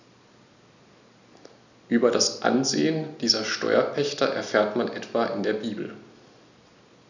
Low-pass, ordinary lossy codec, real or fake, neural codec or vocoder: 7.2 kHz; none; real; none